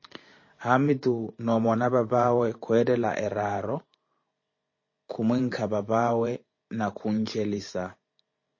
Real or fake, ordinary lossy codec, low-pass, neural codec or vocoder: fake; MP3, 32 kbps; 7.2 kHz; vocoder, 44.1 kHz, 128 mel bands every 512 samples, BigVGAN v2